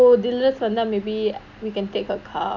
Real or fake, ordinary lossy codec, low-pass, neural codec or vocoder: real; none; 7.2 kHz; none